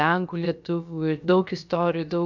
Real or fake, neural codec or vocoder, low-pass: fake; codec, 16 kHz, about 1 kbps, DyCAST, with the encoder's durations; 7.2 kHz